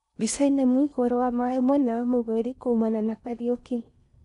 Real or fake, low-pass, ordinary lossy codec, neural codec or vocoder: fake; 10.8 kHz; none; codec, 16 kHz in and 24 kHz out, 0.8 kbps, FocalCodec, streaming, 65536 codes